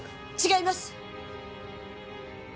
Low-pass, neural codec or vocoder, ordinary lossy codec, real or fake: none; none; none; real